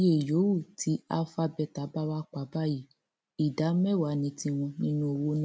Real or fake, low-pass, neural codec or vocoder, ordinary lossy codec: real; none; none; none